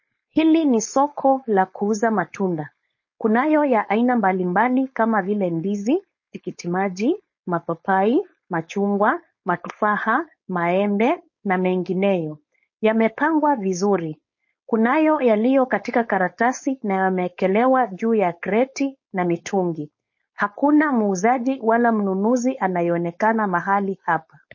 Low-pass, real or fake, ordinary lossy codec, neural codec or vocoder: 7.2 kHz; fake; MP3, 32 kbps; codec, 16 kHz, 4.8 kbps, FACodec